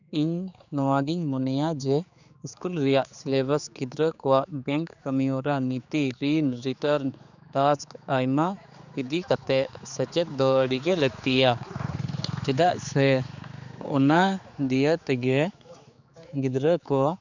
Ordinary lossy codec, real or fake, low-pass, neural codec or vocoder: none; fake; 7.2 kHz; codec, 16 kHz, 4 kbps, X-Codec, HuBERT features, trained on general audio